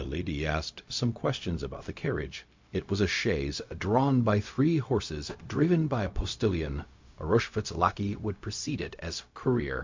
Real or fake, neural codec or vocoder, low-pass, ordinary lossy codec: fake; codec, 16 kHz, 0.4 kbps, LongCat-Audio-Codec; 7.2 kHz; MP3, 48 kbps